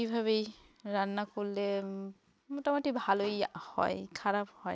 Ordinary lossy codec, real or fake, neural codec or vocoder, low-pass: none; real; none; none